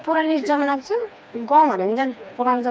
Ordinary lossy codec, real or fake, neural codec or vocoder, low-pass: none; fake; codec, 16 kHz, 2 kbps, FreqCodec, smaller model; none